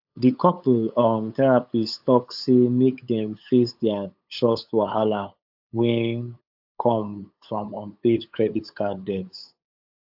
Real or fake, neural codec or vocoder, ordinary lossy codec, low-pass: fake; codec, 16 kHz, 8 kbps, FunCodec, trained on LibriTTS, 25 frames a second; AAC, 48 kbps; 5.4 kHz